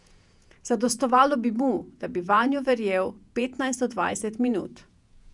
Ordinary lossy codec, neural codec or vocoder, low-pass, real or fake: none; vocoder, 48 kHz, 128 mel bands, Vocos; 10.8 kHz; fake